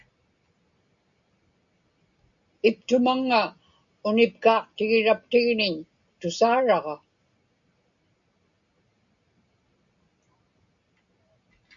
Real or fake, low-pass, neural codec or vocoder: real; 7.2 kHz; none